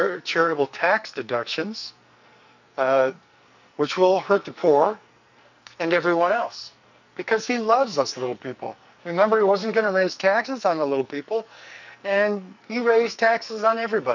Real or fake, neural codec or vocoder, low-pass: fake; codec, 44.1 kHz, 2.6 kbps, SNAC; 7.2 kHz